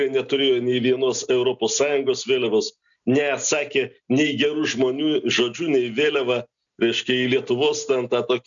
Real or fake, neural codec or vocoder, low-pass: real; none; 7.2 kHz